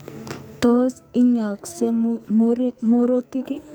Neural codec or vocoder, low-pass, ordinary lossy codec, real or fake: codec, 44.1 kHz, 2.6 kbps, SNAC; none; none; fake